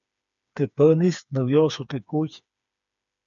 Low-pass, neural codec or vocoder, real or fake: 7.2 kHz; codec, 16 kHz, 4 kbps, FreqCodec, smaller model; fake